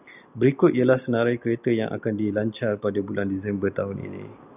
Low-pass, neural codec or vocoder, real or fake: 3.6 kHz; none; real